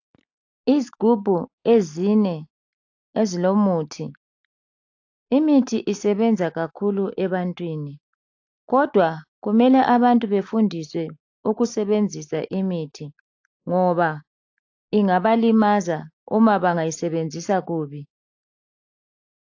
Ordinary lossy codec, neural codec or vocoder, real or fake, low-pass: AAC, 48 kbps; none; real; 7.2 kHz